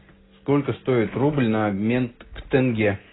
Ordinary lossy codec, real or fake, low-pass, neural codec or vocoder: AAC, 16 kbps; real; 7.2 kHz; none